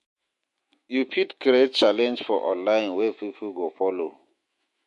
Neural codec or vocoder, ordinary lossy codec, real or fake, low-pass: autoencoder, 48 kHz, 128 numbers a frame, DAC-VAE, trained on Japanese speech; MP3, 48 kbps; fake; 14.4 kHz